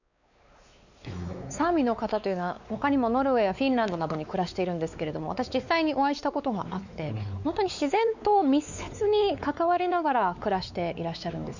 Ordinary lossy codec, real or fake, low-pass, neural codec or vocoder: none; fake; 7.2 kHz; codec, 16 kHz, 4 kbps, X-Codec, WavLM features, trained on Multilingual LibriSpeech